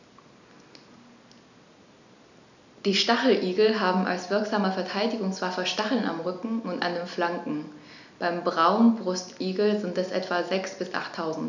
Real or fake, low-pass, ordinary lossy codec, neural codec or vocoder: real; 7.2 kHz; none; none